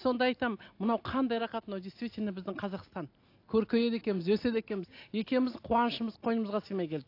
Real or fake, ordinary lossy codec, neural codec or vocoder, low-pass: real; AAC, 32 kbps; none; 5.4 kHz